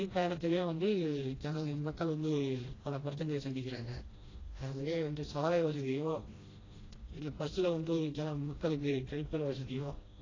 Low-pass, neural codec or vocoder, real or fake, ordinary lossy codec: 7.2 kHz; codec, 16 kHz, 1 kbps, FreqCodec, smaller model; fake; AAC, 32 kbps